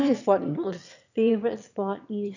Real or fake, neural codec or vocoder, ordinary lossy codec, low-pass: fake; autoencoder, 22.05 kHz, a latent of 192 numbers a frame, VITS, trained on one speaker; none; 7.2 kHz